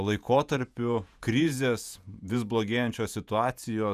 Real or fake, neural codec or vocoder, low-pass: fake; vocoder, 48 kHz, 128 mel bands, Vocos; 14.4 kHz